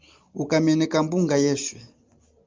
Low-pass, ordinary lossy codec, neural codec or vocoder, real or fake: 7.2 kHz; Opus, 24 kbps; none; real